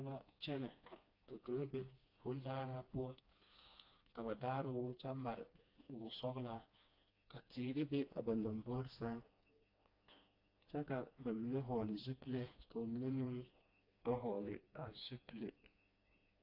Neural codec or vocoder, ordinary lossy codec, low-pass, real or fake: codec, 16 kHz, 2 kbps, FreqCodec, smaller model; AAC, 32 kbps; 5.4 kHz; fake